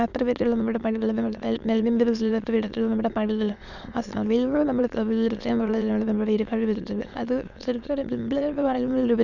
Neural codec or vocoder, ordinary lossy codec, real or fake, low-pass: autoencoder, 22.05 kHz, a latent of 192 numbers a frame, VITS, trained on many speakers; none; fake; 7.2 kHz